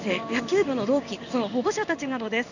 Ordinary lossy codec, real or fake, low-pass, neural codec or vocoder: none; fake; 7.2 kHz; codec, 16 kHz in and 24 kHz out, 1 kbps, XY-Tokenizer